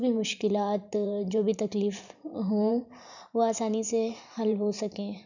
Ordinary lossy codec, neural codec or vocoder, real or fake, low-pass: none; vocoder, 44.1 kHz, 128 mel bands every 512 samples, BigVGAN v2; fake; 7.2 kHz